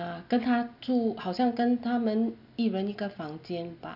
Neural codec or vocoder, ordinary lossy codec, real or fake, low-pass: none; none; real; 5.4 kHz